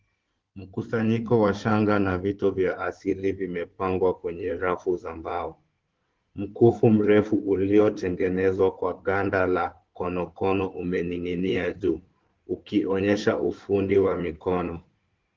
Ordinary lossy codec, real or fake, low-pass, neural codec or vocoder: Opus, 16 kbps; fake; 7.2 kHz; codec, 16 kHz in and 24 kHz out, 2.2 kbps, FireRedTTS-2 codec